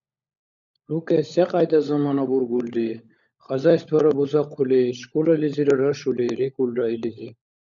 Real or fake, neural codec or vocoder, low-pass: fake; codec, 16 kHz, 16 kbps, FunCodec, trained on LibriTTS, 50 frames a second; 7.2 kHz